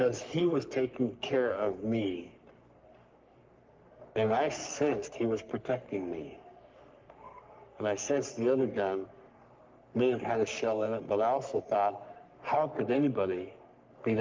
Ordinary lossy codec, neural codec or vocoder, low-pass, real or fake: Opus, 32 kbps; codec, 44.1 kHz, 3.4 kbps, Pupu-Codec; 7.2 kHz; fake